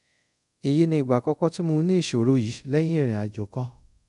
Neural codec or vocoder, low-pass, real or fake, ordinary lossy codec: codec, 24 kHz, 0.5 kbps, DualCodec; 10.8 kHz; fake; none